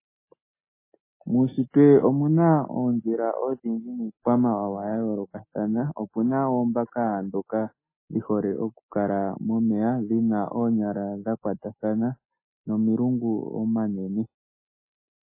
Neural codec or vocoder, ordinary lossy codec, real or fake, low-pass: none; MP3, 16 kbps; real; 3.6 kHz